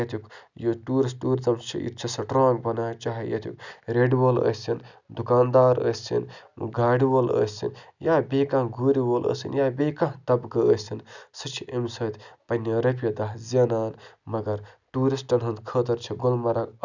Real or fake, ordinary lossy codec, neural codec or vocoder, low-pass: real; none; none; 7.2 kHz